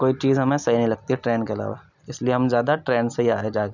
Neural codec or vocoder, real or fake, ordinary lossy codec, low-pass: none; real; none; 7.2 kHz